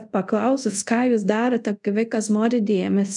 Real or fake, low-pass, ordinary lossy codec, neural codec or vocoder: fake; 10.8 kHz; MP3, 96 kbps; codec, 24 kHz, 0.5 kbps, DualCodec